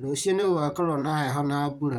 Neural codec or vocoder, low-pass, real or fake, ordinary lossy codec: vocoder, 44.1 kHz, 128 mel bands, Pupu-Vocoder; 19.8 kHz; fake; none